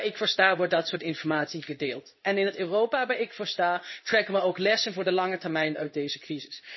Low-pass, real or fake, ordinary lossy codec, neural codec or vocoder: 7.2 kHz; fake; MP3, 24 kbps; codec, 16 kHz in and 24 kHz out, 1 kbps, XY-Tokenizer